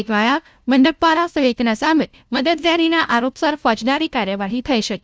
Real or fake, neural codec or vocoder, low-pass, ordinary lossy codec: fake; codec, 16 kHz, 0.5 kbps, FunCodec, trained on LibriTTS, 25 frames a second; none; none